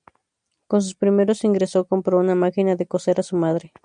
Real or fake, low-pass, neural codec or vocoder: real; 9.9 kHz; none